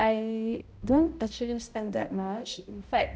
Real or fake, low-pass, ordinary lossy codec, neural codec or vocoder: fake; none; none; codec, 16 kHz, 0.5 kbps, X-Codec, HuBERT features, trained on balanced general audio